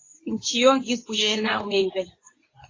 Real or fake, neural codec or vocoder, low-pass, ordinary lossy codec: fake; codec, 24 kHz, 0.9 kbps, WavTokenizer, medium speech release version 2; 7.2 kHz; AAC, 32 kbps